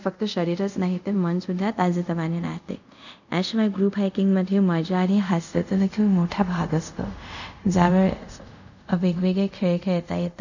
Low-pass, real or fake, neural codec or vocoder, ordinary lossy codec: 7.2 kHz; fake; codec, 24 kHz, 0.5 kbps, DualCodec; none